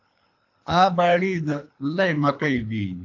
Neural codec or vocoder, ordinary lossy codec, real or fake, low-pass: codec, 24 kHz, 3 kbps, HILCodec; AAC, 48 kbps; fake; 7.2 kHz